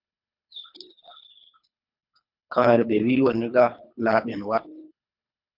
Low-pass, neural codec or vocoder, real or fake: 5.4 kHz; codec, 24 kHz, 3 kbps, HILCodec; fake